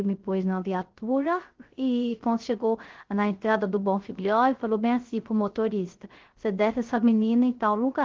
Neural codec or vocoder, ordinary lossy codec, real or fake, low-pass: codec, 16 kHz, 0.3 kbps, FocalCodec; Opus, 16 kbps; fake; 7.2 kHz